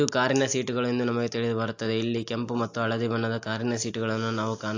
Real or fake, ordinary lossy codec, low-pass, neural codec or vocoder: real; AAC, 48 kbps; 7.2 kHz; none